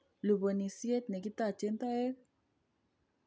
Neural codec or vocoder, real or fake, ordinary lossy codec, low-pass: none; real; none; none